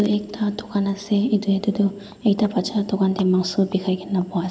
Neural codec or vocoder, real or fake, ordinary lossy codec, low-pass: none; real; none; none